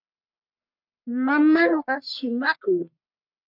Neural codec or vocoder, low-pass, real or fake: codec, 44.1 kHz, 1.7 kbps, Pupu-Codec; 5.4 kHz; fake